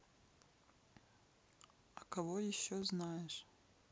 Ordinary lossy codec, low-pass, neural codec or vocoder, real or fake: none; none; none; real